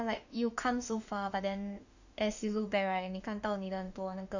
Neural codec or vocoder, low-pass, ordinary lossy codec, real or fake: autoencoder, 48 kHz, 32 numbers a frame, DAC-VAE, trained on Japanese speech; 7.2 kHz; none; fake